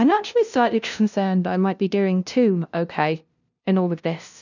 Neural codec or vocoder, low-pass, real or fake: codec, 16 kHz, 0.5 kbps, FunCodec, trained on LibriTTS, 25 frames a second; 7.2 kHz; fake